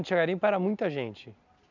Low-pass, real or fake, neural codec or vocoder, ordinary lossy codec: 7.2 kHz; real; none; none